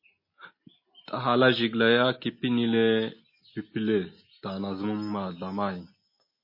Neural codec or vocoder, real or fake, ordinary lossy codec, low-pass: none; real; MP3, 24 kbps; 5.4 kHz